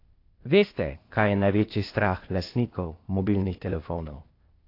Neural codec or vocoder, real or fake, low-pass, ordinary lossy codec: codec, 16 kHz, 0.8 kbps, ZipCodec; fake; 5.4 kHz; AAC, 32 kbps